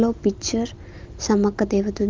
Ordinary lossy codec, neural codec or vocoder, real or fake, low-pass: Opus, 24 kbps; none; real; 7.2 kHz